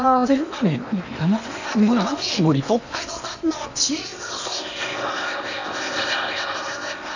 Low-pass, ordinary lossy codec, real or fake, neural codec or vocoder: 7.2 kHz; none; fake; codec, 16 kHz in and 24 kHz out, 0.8 kbps, FocalCodec, streaming, 65536 codes